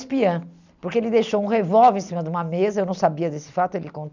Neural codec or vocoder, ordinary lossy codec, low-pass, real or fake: none; none; 7.2 kHz; real